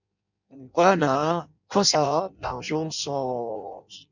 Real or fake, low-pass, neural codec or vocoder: fake; 7.2 kHz; codec, 16 kHz in and 24 kHz out, 0.6 kbps, FireRedTTS-2 codec